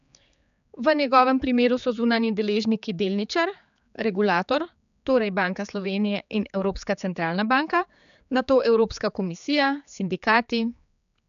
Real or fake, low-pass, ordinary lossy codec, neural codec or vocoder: fake; 7.2 kHz; none; codec, 16 kHz, 4 kbps, X-Codec, HuBERT features, trained on general audio